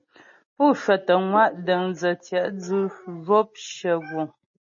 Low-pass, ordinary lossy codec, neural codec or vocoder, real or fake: 7.2 kHz; MP3, 32 kbps; none; real